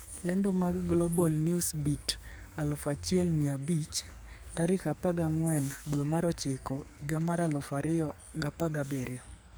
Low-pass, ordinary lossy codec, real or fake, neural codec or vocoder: none; none; fake; codec, 44.1 kHz, 2.6 kbps, SNAC